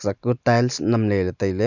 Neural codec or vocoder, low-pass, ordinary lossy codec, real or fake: none; 7.2 kHz; none; real